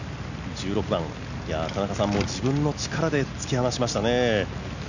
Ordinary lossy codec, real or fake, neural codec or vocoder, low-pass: none; real; none; 7.2 kHz